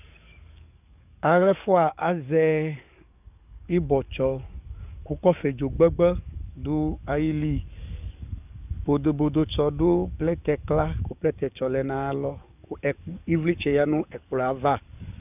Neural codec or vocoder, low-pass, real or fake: codec, 44.1 kHz, 7.8 kbps, DAC; 3.6 kHz; fake